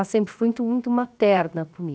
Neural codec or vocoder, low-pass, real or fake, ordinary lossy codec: codec, 16 kHz, 0.7 kbps, FocalCodec; none; fake; none